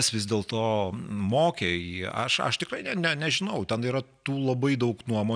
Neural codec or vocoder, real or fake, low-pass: none; real; 9.9 kHz